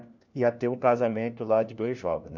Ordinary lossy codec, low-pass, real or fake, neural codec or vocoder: none; 7.2 kHz; fake; codec, 16 kHz, 1 kbps, FunCodec, trained on LibriTTS, 50 frames a second